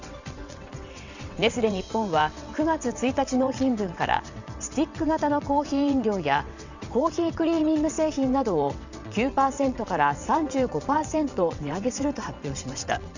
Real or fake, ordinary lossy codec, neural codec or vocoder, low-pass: fake; none; vocoder, 22.05 kHz, 80 mel bands, WaveNeXt; 7.2 kHz